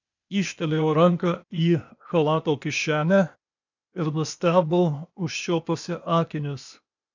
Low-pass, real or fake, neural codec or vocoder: 7.2 kHz; fake; codec, 16 kHz, 0.8 kbps, ZipCodec